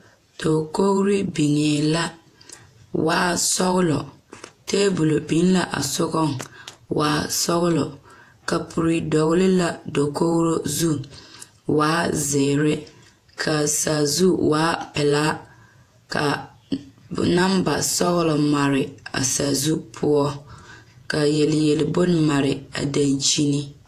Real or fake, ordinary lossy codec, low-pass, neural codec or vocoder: fake; AAC, 64 kbps; 14.4 kHz; vocoder, 48 kHz, 128 mel bands, Vocos